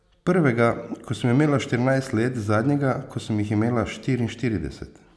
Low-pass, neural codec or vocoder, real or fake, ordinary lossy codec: none; none; real; none